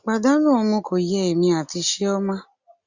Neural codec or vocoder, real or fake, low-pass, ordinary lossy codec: none; real; 7.2 kHz; Opus, 64 kbps